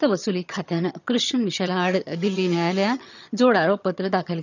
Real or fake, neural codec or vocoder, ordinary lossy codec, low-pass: fake; vocoder, 22.05 kHz, 80 mel bands, HiFi-GAN; none; 7.2 kHz